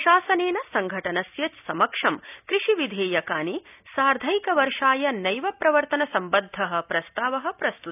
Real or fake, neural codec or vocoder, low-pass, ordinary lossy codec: real; none; 3.6 kHz; none